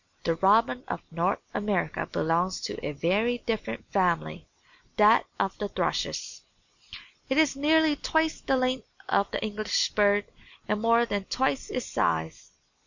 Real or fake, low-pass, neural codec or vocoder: real; 7.2 kHz; none